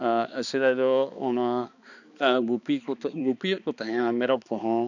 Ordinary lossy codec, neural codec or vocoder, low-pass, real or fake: none; codec, 16 kHz, 2 kbps, X-Codec, HuBERT features, trained on balanced general audio; 7.2 kHz; fake